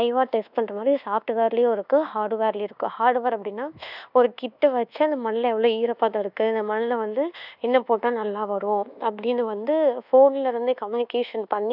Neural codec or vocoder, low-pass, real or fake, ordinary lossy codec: codec, 24 kHz, 1.2 kbps, DualCodec; 5.4 kHz; fake; none